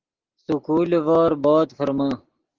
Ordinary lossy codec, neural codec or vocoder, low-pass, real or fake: Opus, 16 kbps; none; 7.2 kHz; real